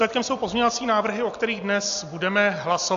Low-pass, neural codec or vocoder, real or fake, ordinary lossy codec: 7.2 kHz; none; real; AAC, 64 kbps